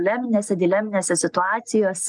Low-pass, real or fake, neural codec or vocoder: 10.8 kHz; real; none